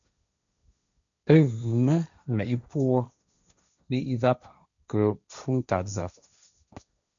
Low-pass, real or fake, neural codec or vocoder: 7.2 kHz; fake; codec, 16 kHz, 1.1 kbps, Voila-Tokenizer